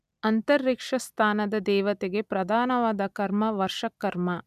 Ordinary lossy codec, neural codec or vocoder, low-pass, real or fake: none; none; 14.4 kHz; real